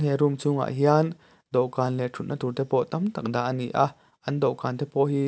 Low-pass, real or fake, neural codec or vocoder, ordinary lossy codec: none; real; none; none